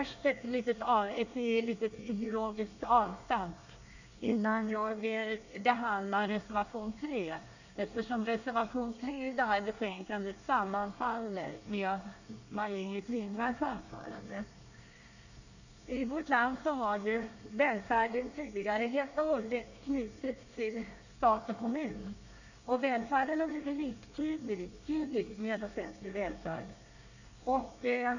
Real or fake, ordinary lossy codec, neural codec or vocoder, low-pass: fake; none; codec, 24 kHz, 1 kbps, SNAC; 7.2 kHz